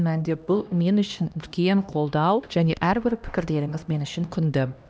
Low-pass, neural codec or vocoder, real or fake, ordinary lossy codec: none; codec, 16 kHz, 1 kbps, X-Codec, HuBERT features, trained on LibriSpeech; fake; none